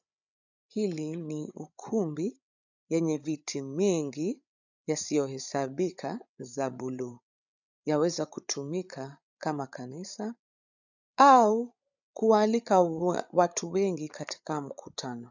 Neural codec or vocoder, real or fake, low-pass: codec, 16 kHz, 8 kbps, FreqCodec, larger model; fake; 7.2 kHz